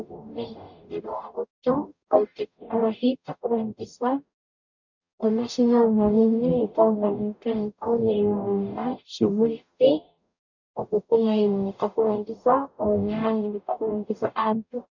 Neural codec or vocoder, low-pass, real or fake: codec, 44.1 kHz, 0.9 kbps, DAC; 7.2 kHz; fake